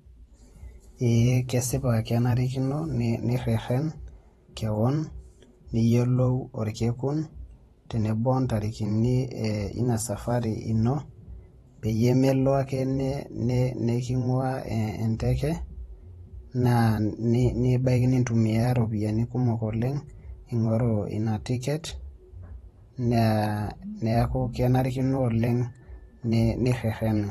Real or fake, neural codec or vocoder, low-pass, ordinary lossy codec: fake; vocoder, 44.1 kHz, 128 mel bands every 512 samples, BigVGAN v2; 19.8 kHz; AAC, 32 kbps